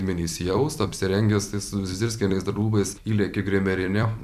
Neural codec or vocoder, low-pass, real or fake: vocoder, 44.1 kHz, 128 mel bands every 256 samples, BigVGAN v2; 14.4 kHz; fake